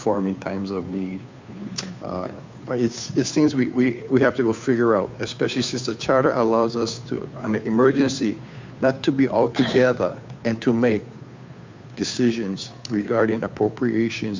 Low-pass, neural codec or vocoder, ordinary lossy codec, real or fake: 7.2 kHz; codec, 16 kHz, 2 kbps, FunCodec, trained on Chinese and English, 25 frames a second; MP3, 64 kbps; fake